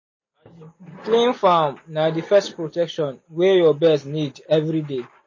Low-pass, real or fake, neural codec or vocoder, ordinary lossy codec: 7.2 kHz; real; none; MP3, 32 kbps